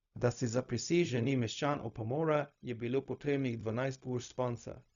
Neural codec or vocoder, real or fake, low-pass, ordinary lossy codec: codec, 16 kHz, 0.4 kbps, LongCat-Audio-Codec; fake; 7.2 kHz; none